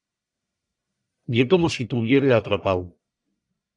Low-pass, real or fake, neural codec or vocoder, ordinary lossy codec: 10.8 kHz; fake; codec, 44.1 kHz, 1.7 kbps, Pupu-Codec; MP3, 96 kbps